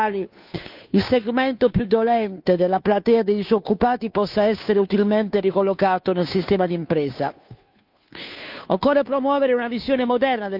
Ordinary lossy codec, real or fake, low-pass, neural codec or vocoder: none; fake; 5.4 kHz; codec, 16 kHz, 2 kbps, FunCodec, trained on Chinese and English, 25 frames a second